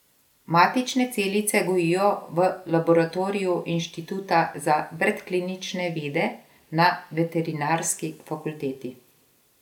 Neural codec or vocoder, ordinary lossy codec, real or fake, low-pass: none; none; real; 19.8 kHz